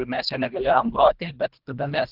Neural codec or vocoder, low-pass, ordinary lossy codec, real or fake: codec, 24 kHz, 1.5 kbps, HILCodec; 5.4 kHz; Opus, 32 kbps; fake